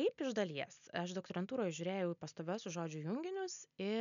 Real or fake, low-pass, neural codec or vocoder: real; 7.2 kHz; none